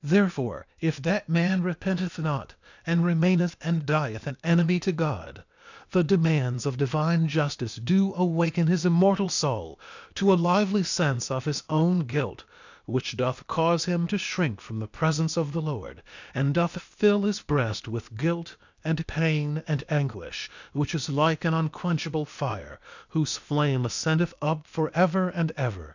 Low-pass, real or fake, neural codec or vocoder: 7.2 kHz; fake; codec, 16 kHz, 0.8 kbps, ZipCodec